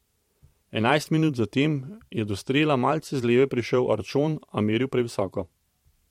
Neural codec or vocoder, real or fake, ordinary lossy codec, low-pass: vocoder, 44.1 kHz, 128 mel bands every 512 samples, BigVGAN v2; fake; MP3, 64 kbps; 19.8 kHz